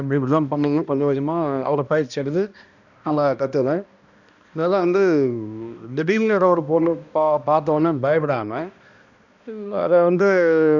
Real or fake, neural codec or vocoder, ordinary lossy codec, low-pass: fake; codec, 16 kHz, 1 kbps, X-Codec, HuBERT features, trained on balanced general audio; none; 7.2 kHz